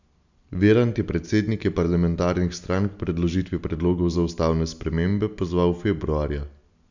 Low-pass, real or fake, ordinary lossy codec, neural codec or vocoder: 7.2 kHz; real; none; none